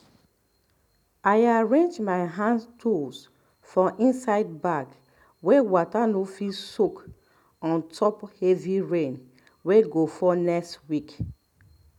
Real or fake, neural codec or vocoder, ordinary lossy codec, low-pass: real; none; none; 19.8 kHz